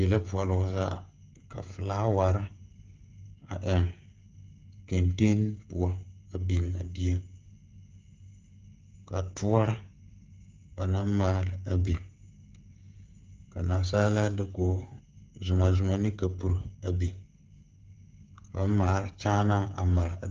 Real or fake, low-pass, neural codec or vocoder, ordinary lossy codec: fake; 7.2 kHz; codec, 16 kHz, 8 kbps, FreqCodec, smaller model; Opus, 32 kbps